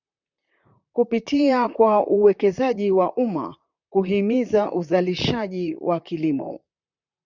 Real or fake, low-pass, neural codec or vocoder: fake; 7.2 kHz; vocoder, 44.1 kHz, 128 mel bands, Pupu-Vocoder